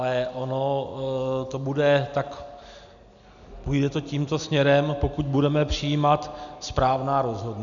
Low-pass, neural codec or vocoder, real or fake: 7.2 kHz; none; real